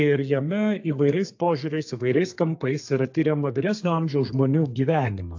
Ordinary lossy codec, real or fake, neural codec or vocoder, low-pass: AAC, 48 kbps; fake; codec, 32 kHz, 1.9 kbps, SNAC; 7.2 kHz